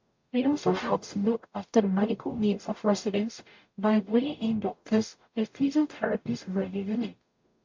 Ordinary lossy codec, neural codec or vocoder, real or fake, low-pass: MP3, 48 kbps; codec, 44.1 kHz, 0.9 kbps, DAC; fake; 7.2 kHz